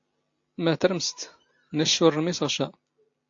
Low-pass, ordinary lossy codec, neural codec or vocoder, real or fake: 7.2 kHz; AAC, 48 kbps; none; real